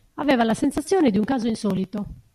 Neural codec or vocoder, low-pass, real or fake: none; 14.4 kHz; real